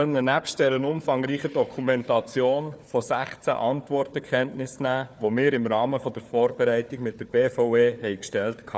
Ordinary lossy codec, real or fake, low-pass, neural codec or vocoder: none; fake; none; codec, 16 kHz, 4 kbps, FunCodec, trained on Chinese and English, 50 frames a second